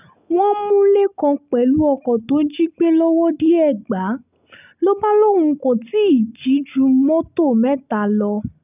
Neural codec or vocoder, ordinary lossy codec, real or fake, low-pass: none; none; real; 3.6 kHz